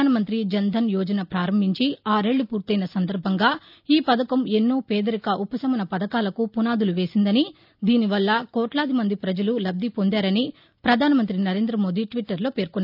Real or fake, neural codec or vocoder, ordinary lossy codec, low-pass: real; none; none; 5.4 kHz